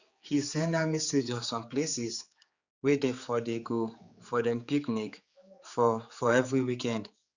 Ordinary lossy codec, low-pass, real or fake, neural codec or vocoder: Opus, 64 kbps; 7.2 kHz; fake; codec, 16 kHz, 4 kbps, X-Codec, HuBERT features, trained on general audio